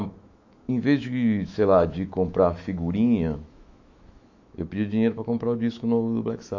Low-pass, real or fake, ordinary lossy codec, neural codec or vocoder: 7.2 kHz; fake; AAC, 48 kbps; autoencoder, 48 kHz, 128 numbers a frame, DAC-VAE, trained on Japanese speech